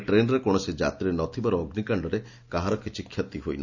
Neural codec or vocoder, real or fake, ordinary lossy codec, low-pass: none; real; none; 7.2 kHz